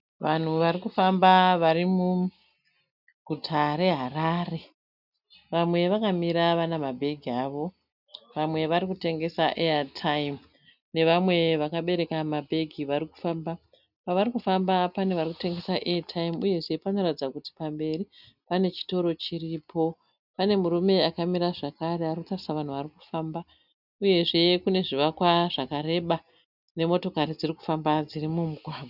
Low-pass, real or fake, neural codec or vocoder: 5.4 kHz; real; none